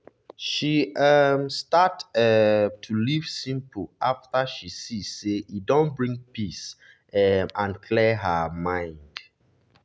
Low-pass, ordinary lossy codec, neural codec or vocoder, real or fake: none; none; none; real